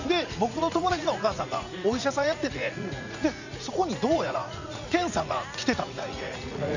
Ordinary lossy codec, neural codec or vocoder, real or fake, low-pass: none; vocoder, 44.1 kHz, 80 mel bands, Vocos; fake; 7.2 kHz